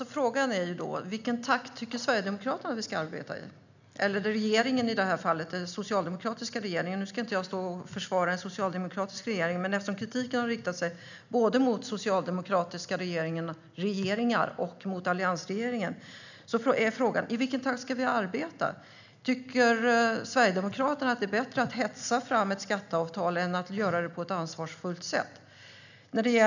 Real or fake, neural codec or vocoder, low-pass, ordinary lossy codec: real; none; 7.2 kHz; none